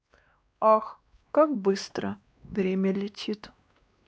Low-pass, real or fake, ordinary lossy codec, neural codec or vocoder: none; fake; none; codec, 16 kHz, 2 kbps, X-Codec, WavLM features, trained on Multilingual LibriSpeech